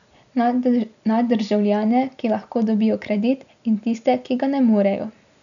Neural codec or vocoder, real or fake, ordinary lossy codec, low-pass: none; real; none; 7.2 kHz